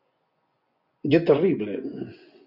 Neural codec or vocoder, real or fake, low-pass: vocoder, 24 kHz, 100 mel bands, Vocos; fake; 5.4 kHz